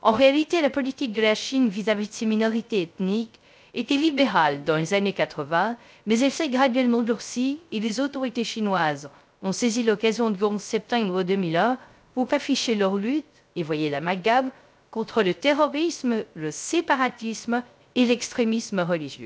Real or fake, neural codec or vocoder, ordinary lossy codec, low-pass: fake; codec, 16 kHz, 0.3 kbps, FocalCodec; none; none